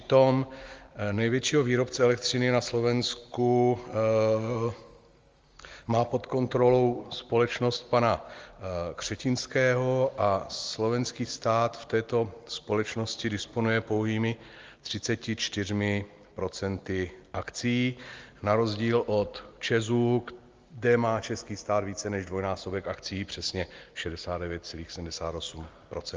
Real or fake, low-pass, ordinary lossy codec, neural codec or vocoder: real; 7.2 kHz; Opus, 32 kbps; none